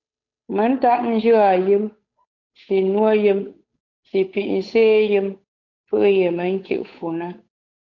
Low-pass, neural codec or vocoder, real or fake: 7.2 kHz; codec, 16 kHz, 8 kbps, FunCodec, trained on Chinese and English, 25 frames a second; fake